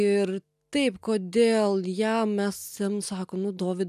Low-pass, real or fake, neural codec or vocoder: 14.4 kHz; real; none